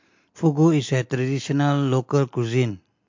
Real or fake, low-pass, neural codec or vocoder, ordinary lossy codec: real; 7.2 kHz; none; MP3, 48 kbps